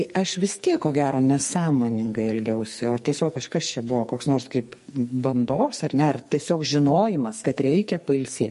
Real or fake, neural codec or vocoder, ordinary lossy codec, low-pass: fake; codec, 44.1 kHz, 2.6 kbps, SNAC; MP3, 48 kbps; 14.4 kHz